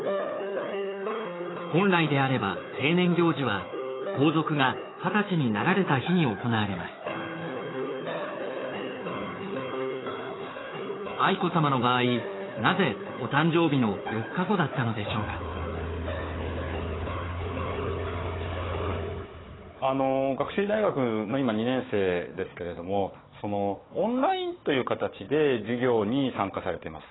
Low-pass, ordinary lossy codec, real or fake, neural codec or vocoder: 7.2 kHz; AAC, 16 kbps; fake; codec, 16 kHz, 4 kbps, FunCodec, trained on Chinese and English, 50 frames a second